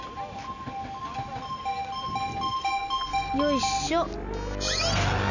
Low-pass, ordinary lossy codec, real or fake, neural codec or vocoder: 7.2 kHz; none; real; none